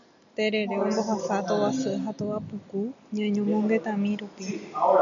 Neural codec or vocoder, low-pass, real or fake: none; 7.2 kHz; real